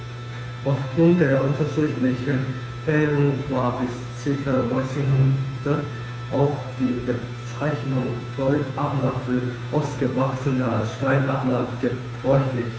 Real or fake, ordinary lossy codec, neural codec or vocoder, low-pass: fake; none; codec, 16 kHz, 2 kbps, FunCodec, trained on Chinese and English, 25 frames a second; none